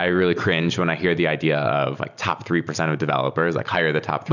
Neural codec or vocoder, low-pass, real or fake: none; 7.2 kHz; real